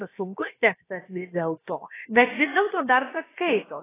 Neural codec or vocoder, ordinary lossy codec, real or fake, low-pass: codec, 16 kHz, about 1 kbps, DyCAST, with the encoder's durations; AAC, 16 kbps; fake; 3.6 kHz